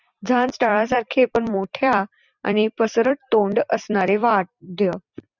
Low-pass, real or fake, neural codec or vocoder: 7.2 kHz; fake; vocoder, 44.1 kHz, 128 mel bands every 256 samples, BigVGAN v2